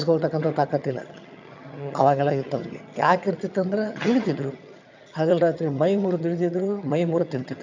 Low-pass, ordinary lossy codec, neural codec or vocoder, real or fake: 7.2 kHz; MP3, 64 kbps; vocoder, 22.05 kHz, 80 mel bands, HiFi-GAN; fake